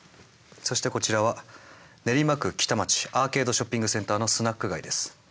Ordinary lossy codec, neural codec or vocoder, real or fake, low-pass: none; none; real; none